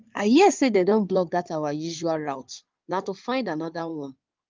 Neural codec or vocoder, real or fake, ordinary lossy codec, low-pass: codec, 16 kHz in and 24 kHz out, 2.2 kbps, FireRedTTS-2 codec; fake; Opus, 32 kbps; 7.2 kHz